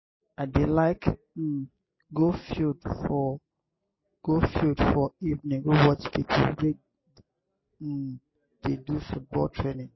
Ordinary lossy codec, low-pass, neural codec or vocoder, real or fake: MP3, 24 kbps; 7.2 kHz; none; real